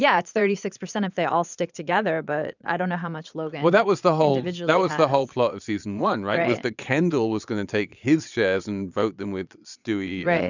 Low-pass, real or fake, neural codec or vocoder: 7.2 kHz; fake; vocoder, 22.05 kHz, 80 mel bands, Vocos